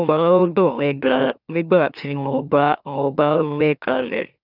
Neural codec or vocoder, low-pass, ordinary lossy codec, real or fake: autoencoder, 44.1 kHz, a latent of 192 numbers a frame, MeloTTS; 5.4 kHz; none; fake